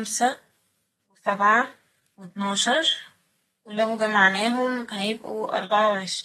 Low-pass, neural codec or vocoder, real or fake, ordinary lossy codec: 14.4 kHz; codec, 32 kHz, 1.9 kbps, SNAC; fake; AAC, 32 kbps